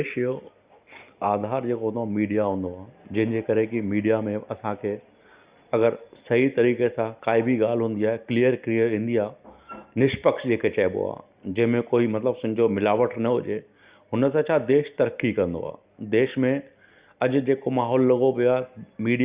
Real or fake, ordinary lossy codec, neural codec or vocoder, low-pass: real; Opus, 64 kbps; none; 3.6 kHz